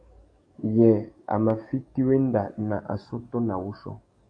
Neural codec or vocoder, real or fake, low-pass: codec, 44.1 kHz, 7.8 kbps, DAC; fake; 9.9 kHz